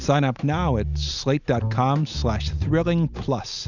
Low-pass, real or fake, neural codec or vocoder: 7.2 kHz; real; none